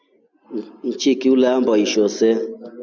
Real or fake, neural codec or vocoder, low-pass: real; none; 7.2 kHz